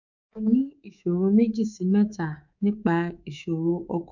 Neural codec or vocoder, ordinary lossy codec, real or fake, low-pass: codec, 24 kHz, 3.1 kbps, DualCodec; none; fake; 7.2 kHz